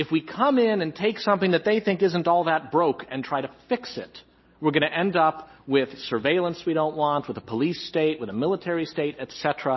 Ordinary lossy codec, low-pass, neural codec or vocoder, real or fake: MP3, 24 kbps; 7.2 kHz; none; real